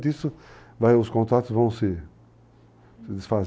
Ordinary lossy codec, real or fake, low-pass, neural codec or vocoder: none; real; none; none